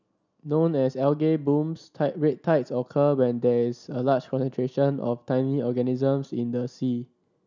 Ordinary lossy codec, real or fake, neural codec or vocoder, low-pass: none; real; none; 7.2 kHz